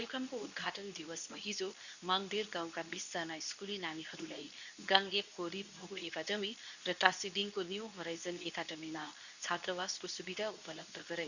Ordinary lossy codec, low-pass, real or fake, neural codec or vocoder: none; 7.2 kHz; fake; codec, 24 kHz, 0.9 kbps, WavTokenizer, medium speech release version 2